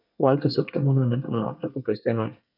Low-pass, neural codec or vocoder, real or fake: 5.4 kHz; codec, 24 kHz, 1 kbps, SNAC; fake